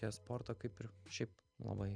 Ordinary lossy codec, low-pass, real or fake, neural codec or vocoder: Opus, 64 kbps; 9.9 kHz; real; none